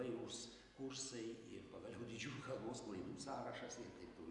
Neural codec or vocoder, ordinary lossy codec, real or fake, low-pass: none; AAC, 64 kbps; real; 10.8 kHz